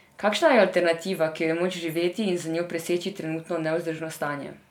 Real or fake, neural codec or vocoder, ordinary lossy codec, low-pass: real; none; none; 19.8 kHz